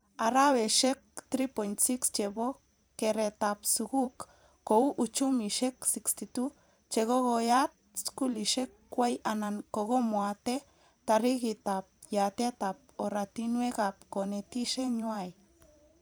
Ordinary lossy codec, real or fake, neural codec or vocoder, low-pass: none; fake; vocoder, 44.1 kHz, 128 mel bands every 512 samples, BigVGAN v2; none